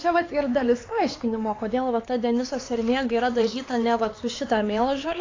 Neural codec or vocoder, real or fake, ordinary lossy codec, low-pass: codec, 16 kHz, 4 kbps, X-Codec, HuBERT features, trained on LibriSpeech; fake; AAC, 32 kbps; 7.2 kHz